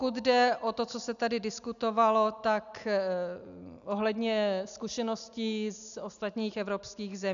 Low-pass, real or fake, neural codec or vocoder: 7.2 kHz; real; none